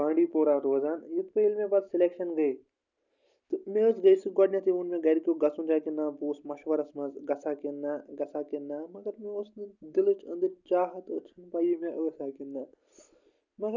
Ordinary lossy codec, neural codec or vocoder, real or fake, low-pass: none; none; real; 7.2 kHz